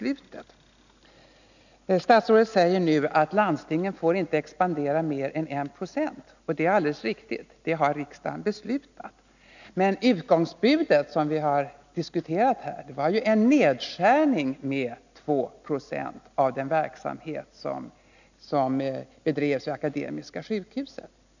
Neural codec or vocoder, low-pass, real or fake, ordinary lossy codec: none; 7.2 kHz; real; none